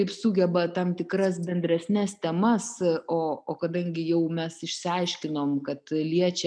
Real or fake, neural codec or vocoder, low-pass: real; none; 9.9 kHz